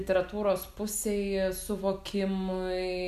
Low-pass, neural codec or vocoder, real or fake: 14.4 kHz; none; real